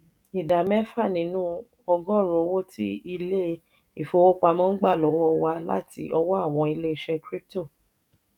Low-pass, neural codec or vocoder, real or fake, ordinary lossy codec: 19.8 kHz; codec, 44.1 kHz, 7.8 kbps, DAC; fake; none